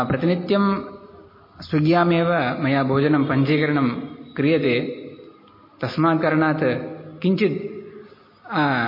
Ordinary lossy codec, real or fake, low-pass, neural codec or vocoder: MP3, 24 kbps; real; 5.4 kHz; none